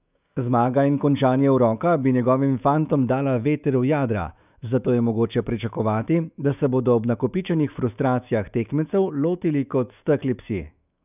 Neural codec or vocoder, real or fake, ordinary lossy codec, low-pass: autoencoder, 48 kHz, 128 numbers a frame, DAC-VAE, trained on Japanese speech; fake; none; 3.6 kHz